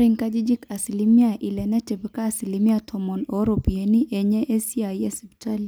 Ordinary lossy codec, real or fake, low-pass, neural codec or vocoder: none; real; none; none